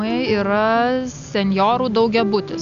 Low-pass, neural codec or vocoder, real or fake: 7.2 kHz; none; real